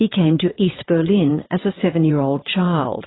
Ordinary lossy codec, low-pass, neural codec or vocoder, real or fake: AAC, 16 kbps; 7.2 kHz; vocoder, 22.05 kHz, 80 mel bands, WaveNeXt; fake